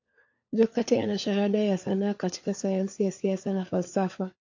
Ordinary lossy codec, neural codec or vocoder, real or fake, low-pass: AAC, 48 kbps; codec, 16 kHz, 4 kbps, FunCodec, trained on LibriTTS, 50 frames a second; fake; 7.2 kHz